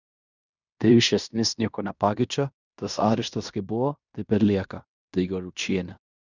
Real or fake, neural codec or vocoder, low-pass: fake; codec, 16 kHz in and 24 kHz out, 0.9 kbps, LongCat-Audio-Codec, fine tuned four codebook decoder; 7.2 kHz